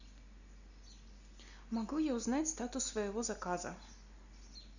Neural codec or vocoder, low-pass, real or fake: none; 7.2 kHz; real